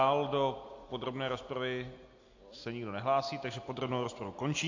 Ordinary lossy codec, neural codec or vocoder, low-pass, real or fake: AAC, 48 kbps; none; 7.2 kHz; real